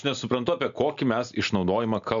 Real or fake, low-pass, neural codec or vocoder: real; 7.2 kHz; none